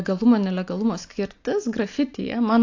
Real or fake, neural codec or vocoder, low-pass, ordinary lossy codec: real; none; 7.2 kHz; AAC, 48 kbps